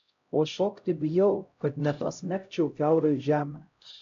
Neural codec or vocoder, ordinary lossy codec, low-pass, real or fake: codec, 16 kHz, 0.5 kbps, X-Codec, HuBERT features, trained on LibriSpeech; AAC, 64 kbps; 7.2 kHz; fake